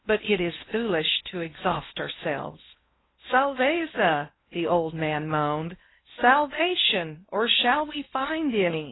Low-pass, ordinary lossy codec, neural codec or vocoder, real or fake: 7.2 kHz; AAC, 16 kbps; codec, 16 kHz in and 24 kHz out, 0.8 kbps, FocalCodec, streaming, 65536 codes; fake